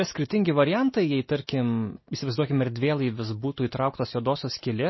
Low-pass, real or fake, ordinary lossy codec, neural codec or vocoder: 7.2 kHz; real; MP3, 24 kbps; none